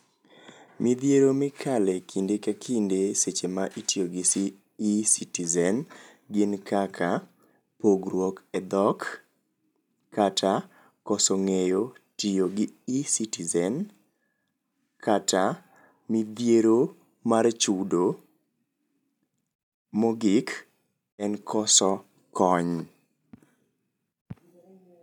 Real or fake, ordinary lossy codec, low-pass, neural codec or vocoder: real; none; 19.8 kHz; none